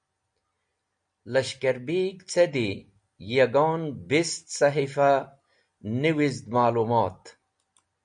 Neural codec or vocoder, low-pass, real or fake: none; 9.9 kHz; real